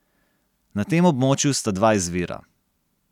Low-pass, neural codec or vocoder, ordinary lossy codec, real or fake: 19.8 kHz; none; none; real